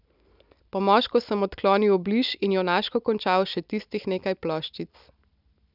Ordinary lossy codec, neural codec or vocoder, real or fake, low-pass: none; none; real; 5.4 kHz